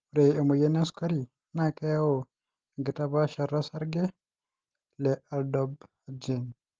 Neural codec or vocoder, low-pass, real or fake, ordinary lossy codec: none; 7.2 kHz; real; Opus, 16 kbps